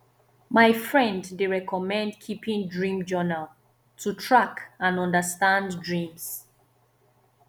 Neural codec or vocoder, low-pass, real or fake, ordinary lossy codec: none; none; real; none